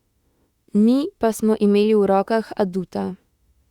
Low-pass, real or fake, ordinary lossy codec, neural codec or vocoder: 19.8 kHz; fake; Opus, 64 kbps; autoencoder, 48 kHz, 32 numbers a frame, DAC-VAE, trained on Japanese speech